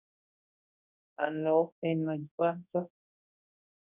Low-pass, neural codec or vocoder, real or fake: 3.6 kHz; codec, 24 kHz, 0.9 kbps, WavTokenizer, large speech release; fake